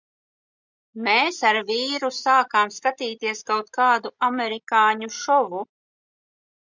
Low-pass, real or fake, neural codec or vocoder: 7.2 kHz; real; none